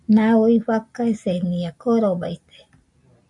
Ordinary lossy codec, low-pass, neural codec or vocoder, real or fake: MP3, 64 kbps; 10.8 kHz; none; real